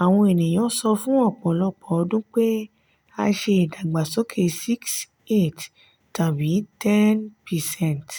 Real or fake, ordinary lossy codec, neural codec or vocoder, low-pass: real; none; none; none